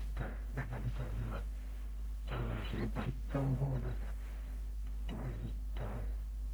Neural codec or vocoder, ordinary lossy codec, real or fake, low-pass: codec, 44.1 kHz, 1.7 kbps, Pupu-Codec; none; fake; none